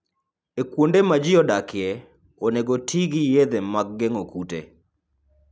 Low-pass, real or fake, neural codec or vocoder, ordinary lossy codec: none; real; none; none